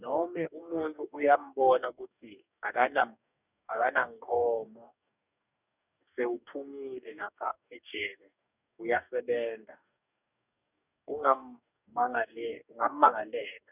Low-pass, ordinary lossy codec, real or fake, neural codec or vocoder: 3.6 kHz; none; fake; codec, 44.1 kHz, 2.6 kbps, DAC